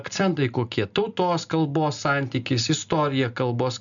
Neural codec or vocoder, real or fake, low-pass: none; real; 7.2 kHz